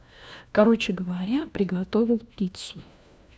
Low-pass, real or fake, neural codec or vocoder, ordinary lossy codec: none; fake; codec, 16 kHz, 1 kbps, FunCodec, trained on LibriTTS, 50 frames a second; none